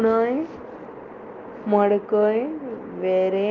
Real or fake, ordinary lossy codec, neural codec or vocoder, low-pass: real; Opus, 32 kbps; none; 7.2 kHz